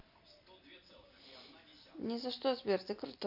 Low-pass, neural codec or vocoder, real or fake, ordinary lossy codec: 5.4 kHz; none; real; AAC, 48 kbps